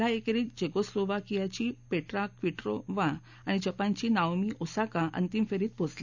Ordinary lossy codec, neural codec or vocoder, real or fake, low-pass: none; none; real; 7.2 kHz